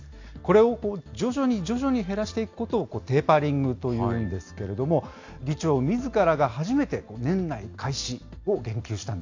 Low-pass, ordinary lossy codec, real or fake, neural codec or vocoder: 7.2 kHz; AAC, 48 kbps; real; none